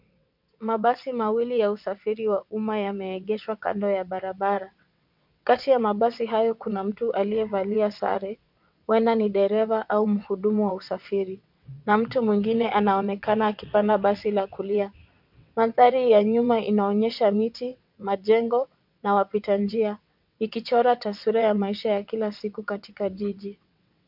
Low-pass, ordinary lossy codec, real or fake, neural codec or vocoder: 5.4 kHz; MP3, 48 kbps; fake; vocoder, 22.05 kHz, 80 mel bands, WaveNeXt